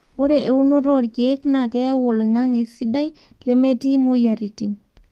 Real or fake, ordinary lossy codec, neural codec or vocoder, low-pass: fake; Opus, 32 kbps; codec, 32 kHz, 1.9 kbps, SNAC; 14.4 kHz